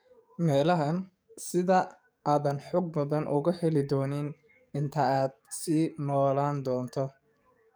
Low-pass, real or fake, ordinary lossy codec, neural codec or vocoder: none; fake; none; codec, 44.1 kHz, 7.8 kbps, DAC